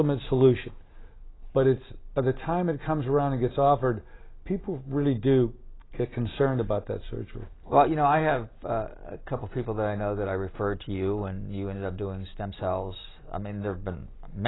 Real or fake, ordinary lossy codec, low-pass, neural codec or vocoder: real; AAC, 16 kbps; 7.2 kHz; none